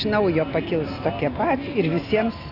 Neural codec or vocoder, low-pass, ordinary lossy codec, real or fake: none; 5.4 kHz; AAC, 24 kbps; real